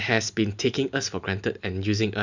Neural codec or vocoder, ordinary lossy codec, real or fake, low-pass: none; none; real; 7.2 kHz